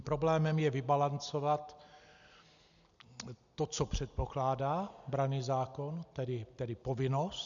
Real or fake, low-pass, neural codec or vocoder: real; 7.2 kHz; none